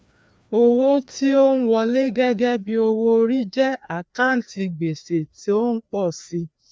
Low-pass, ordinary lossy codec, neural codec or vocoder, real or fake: none; none; codec, 16 kHz, 2 kbps, FreqCodec, larger model; fake